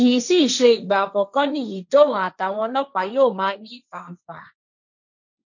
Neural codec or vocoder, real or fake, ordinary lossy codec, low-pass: codec, 16 kHz, 1.1 kbps, Voila-Tokenizer; fake; none; 7.2 kHz